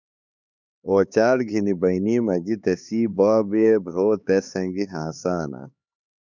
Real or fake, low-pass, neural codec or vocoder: fake; 7.2 kHz; codec, 16 kHz, 4 kbps, X-Codec, HuBERT features, trained on LibriSpeech